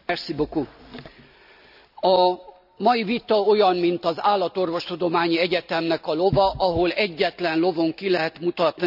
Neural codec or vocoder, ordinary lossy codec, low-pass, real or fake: none; none; 5.4 kHz; real